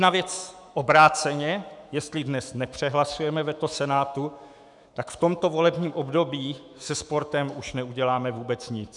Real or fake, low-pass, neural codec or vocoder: fake; 10.8 kHz; autoencoder, 48 kHz, 128 numbers a frame, DAC-VAE, trained on Japanese speech